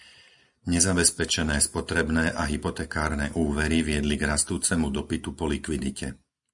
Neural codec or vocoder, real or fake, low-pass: none; real; 10.8 kHz